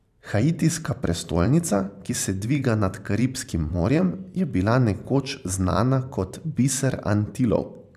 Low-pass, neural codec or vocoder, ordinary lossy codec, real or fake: 14.4 kHz; vocoder, 44.1 kHz, 128 mel bands every 512 samples, BigVGAN v2; none; fake